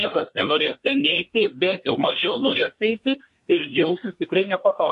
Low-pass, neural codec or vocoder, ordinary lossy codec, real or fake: 10.8 kHz; codec, 24 kHz, 1 kbps, SNAC; MP3, 64 kbps; fake